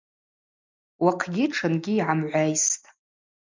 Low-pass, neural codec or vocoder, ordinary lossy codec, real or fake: 7.2 kHz; none; MP3, 64 kbps; real